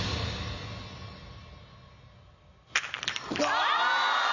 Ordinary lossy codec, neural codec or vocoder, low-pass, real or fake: none; none; 7.2 kHz; real